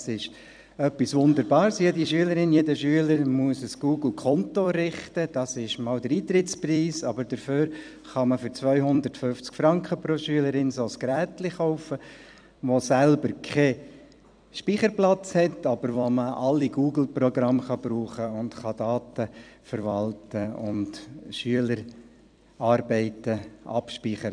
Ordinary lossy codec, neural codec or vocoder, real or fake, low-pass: none; vocoder, 44.1 kHz, 128 mel bands every 256 samples, BigVGAN v2; fake; 9.9 kHz